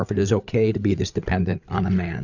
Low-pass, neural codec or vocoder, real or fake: 7.2 kHz; codec, 16 kHz, 8 kbps, FreqCodec, larger model; fake